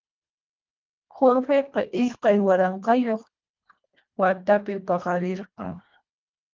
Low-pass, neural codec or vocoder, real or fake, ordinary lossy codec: 7.2 kHz; codec, 24 kHz, 1.5 kbps, HILCodec; fake; Opus, 32 kbps